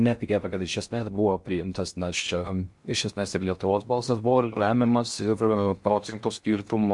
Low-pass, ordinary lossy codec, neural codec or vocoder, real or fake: 10.8 kHz; MP3, 64 kbps; codec, 16 kHz in and 24 kHz out, 0.6 kbps, FocalCodec, streaming, 4096 codes; fake